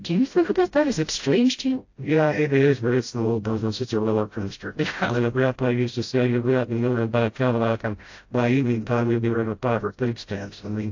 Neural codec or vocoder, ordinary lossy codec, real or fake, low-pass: codec, 16 kHz, 0.5 kbps, FreqCodec, smaller model; AAC, 48 kbps; fake; 7.2 kHz